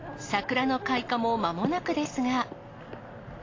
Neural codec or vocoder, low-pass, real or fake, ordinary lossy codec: none; 7.2 kHz; real; AAC, 32 kbps